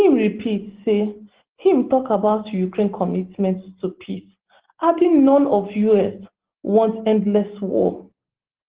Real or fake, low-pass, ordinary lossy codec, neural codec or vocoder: real; 3.6 kHz; Opus, 16 kbps; none